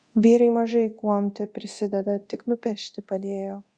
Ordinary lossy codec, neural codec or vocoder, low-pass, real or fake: Opus, 64 kbps; codec, 24 kHz, 0.9 kbps, DualCodec; 9.9 kHz; fake